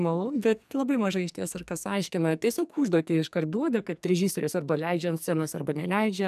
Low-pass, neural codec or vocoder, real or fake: 14.4 kHz; codec, 44.1 kHz, 2.6 kbps, SNAC; fake